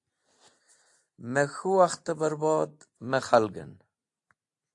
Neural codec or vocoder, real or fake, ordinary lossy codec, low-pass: none; real; AAC, 64 kbps; 10.8 kHz